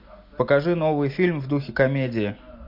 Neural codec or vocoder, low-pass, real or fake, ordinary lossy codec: none; 5.4 kHz; real; MP3, 48 kbps